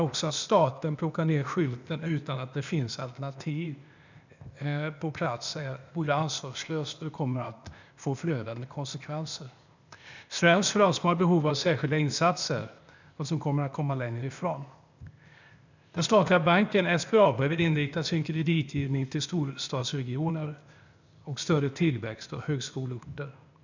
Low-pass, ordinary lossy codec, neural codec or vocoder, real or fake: 7.2 kHz; none; codec, 16 kHz, 0.8 kbps, ZipCodec; fake